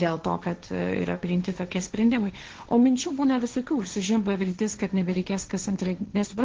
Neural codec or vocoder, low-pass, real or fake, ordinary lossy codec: codec, 16 kHz, 1.1 kbps, Voila-Tokenizer; 7.2 kHz; fake; Opus, 16 kbps